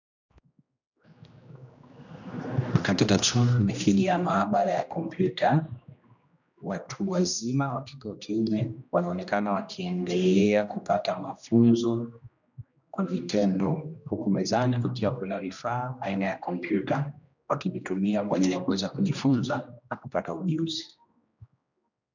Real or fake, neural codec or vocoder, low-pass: fake; codec, 16 kHz, 1 kbps, X-Codec, HuBERT features, trained on general audio; 7.2 kHz